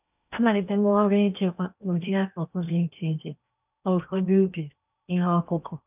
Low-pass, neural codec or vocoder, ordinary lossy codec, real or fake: 3.6 kHz; codec, 16 kHz in and 24 kHz out, 0.8 kbps, FocalCodec, streaming, 65536 codes; none; fake